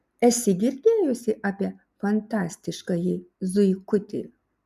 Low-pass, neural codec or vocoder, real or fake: 14.4 kHz; none; real